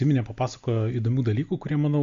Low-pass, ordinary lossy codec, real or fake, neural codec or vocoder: 7.2 kHz; MP3, 48 kbps; real; none